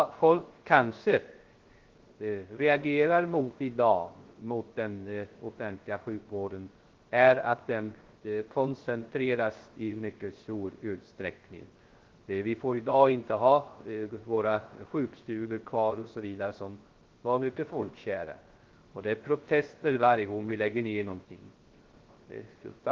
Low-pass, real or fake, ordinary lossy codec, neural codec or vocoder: 7.2 kHz; fake; Opus, 16 kbps; codec, 16 kHz, 0.3 kbps, FocalCodec